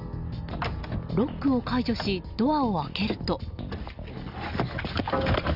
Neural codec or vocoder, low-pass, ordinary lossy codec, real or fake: none; 5.4 kHz; none; real